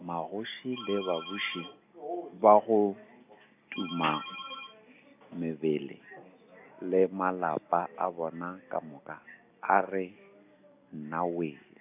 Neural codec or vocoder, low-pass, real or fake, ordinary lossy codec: none; 3.6 kHz; real; AAC, 32 kbps